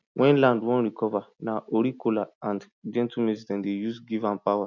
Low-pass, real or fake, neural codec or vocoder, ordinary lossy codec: 7.2 kHz; real; none; none